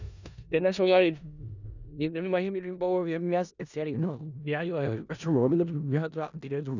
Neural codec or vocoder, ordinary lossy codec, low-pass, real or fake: codec, 16 kHz in and 24 kHz out, 0.4 kbps, LongCat-Audio-Codec, four codebook decoder; none; 7.2 kHz; fake